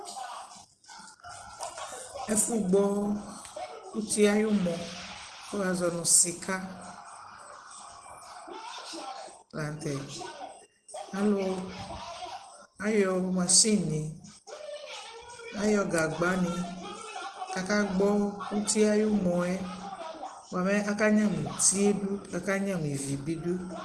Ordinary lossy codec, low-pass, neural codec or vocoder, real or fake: Opus, 16 kbps; 10.8 kHz; none; real